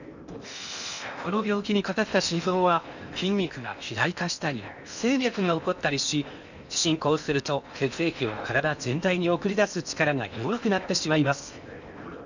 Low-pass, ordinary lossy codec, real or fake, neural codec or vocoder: 7.2 kHz; none; fake; codec, 16 kHz in and 24 kHz out, 0.8 kbps, FocalCodec, streaming, 65536 codes